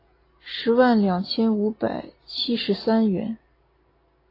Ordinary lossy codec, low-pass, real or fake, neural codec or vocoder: AAC, 24 kbps; 5.4 kHz; real; none